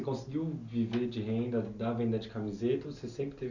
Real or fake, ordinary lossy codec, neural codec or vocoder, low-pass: real; none; none; 7.2 kHz